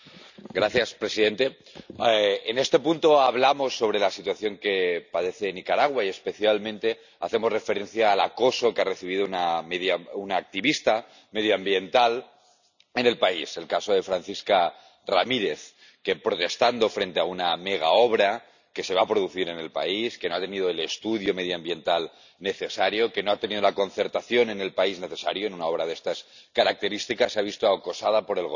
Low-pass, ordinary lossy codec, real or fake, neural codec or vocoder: 7.2 kHz; none; real; none